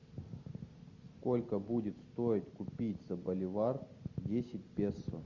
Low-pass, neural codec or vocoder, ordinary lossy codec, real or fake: 7.2 kHz; none; Opus, 64 kbps; real